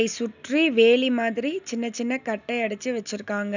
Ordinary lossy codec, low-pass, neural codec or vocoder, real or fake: none; 7.2 kHz; none; real